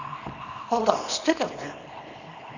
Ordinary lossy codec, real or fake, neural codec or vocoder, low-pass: none; fake; codec, 24 kHz, 0.9 kbps, WavTokenizer, small release; 7.2 kHz